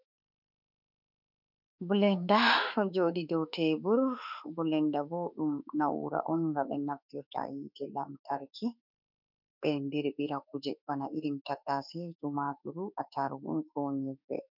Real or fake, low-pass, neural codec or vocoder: fake; 5.4 kHz; autoencoder, 48 kHz, 32 numbers a frame, DAC-VAE, trained on Japanese speech